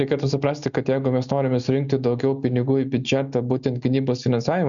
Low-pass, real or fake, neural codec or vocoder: 7.2 kHz; real; none